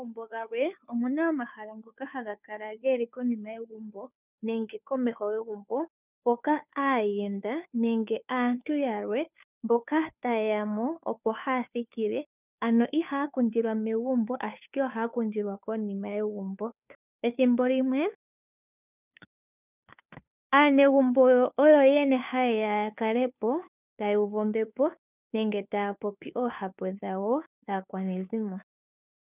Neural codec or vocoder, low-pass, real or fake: codec, 16 kHz, 2 kbps, FunCodec, trained on Chinese and English, 25 frames a second; 3.6 kHz; fake